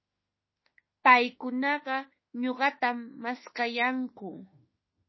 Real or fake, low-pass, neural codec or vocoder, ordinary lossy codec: fake; 7.2 kHz; autoencoder, 48 kHz, 32 numbers a frame, DAC-VAE, trained on Japanese speech; MP3, 24 kbps